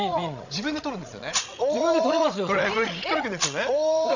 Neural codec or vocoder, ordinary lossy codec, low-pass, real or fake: codec, 16 kHz, 16 kbps, FreqCodec, larger model; none; 7.2 kHz; fake